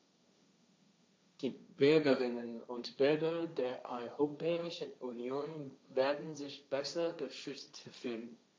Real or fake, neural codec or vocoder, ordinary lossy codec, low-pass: fake; codec, 16 kHz, 1.1 kbps, Voila-Tokenizer; none; none